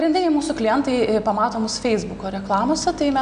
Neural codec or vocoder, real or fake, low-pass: none; real; 9.9 kHz